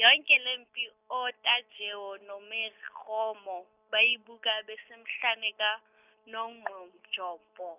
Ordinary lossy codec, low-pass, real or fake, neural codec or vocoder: none; 3.6 kHz; real; none